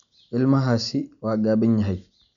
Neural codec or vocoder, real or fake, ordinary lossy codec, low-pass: none; real; none; 7.2 kHz